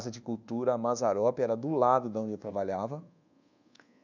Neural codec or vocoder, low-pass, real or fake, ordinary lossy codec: codec, 24 kHz, 1.2 kbps, DualCodec; 7.2 kHz; fake; none